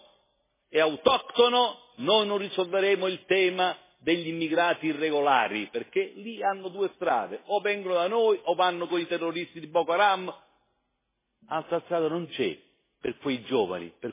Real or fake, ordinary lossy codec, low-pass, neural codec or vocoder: real; MP3, 16 kbps; 3.6 kHz; none